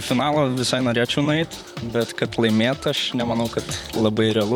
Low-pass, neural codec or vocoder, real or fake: 19.8 kHz; vocoder, 44.1 kHz, 128 mel bands, Pupu-Vocoder; fake